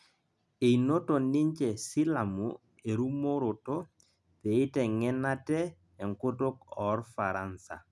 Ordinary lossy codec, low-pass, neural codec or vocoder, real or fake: none; none; none; real